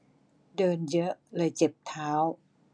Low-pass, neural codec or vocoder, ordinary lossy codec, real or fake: 9.9 kHz; none; none; real